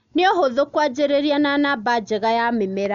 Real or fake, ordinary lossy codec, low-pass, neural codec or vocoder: real; none; 7.2 kHz; none